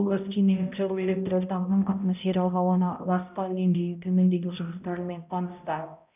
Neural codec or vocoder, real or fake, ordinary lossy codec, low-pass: codec, 16 kHz, 0.5 kbps, X-Codec, HuBERT features, trained on balanced general audio; fake; none; 3.6 kHz